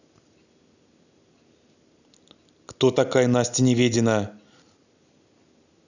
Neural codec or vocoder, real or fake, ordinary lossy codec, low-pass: none; real; none; 7.2 kHz